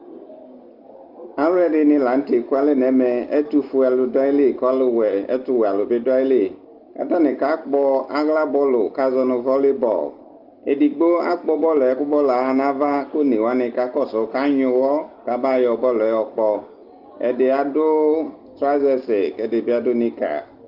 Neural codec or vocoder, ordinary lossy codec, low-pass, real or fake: none; Opus, 32 kbps; 5.4 kHz; real